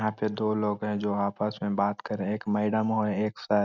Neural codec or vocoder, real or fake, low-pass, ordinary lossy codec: none; real; 7.2 kHz; none